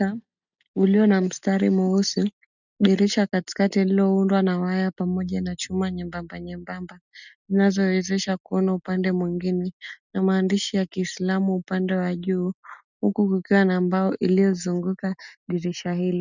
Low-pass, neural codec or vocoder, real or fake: 7.2 kHz; none; real